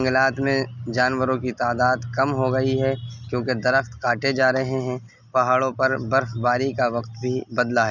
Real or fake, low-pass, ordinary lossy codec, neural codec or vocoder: real; 7.2 kHz; none; none